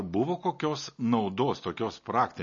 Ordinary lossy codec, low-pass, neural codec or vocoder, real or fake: MP3, 32 kbps; 7.2 kHz; none; real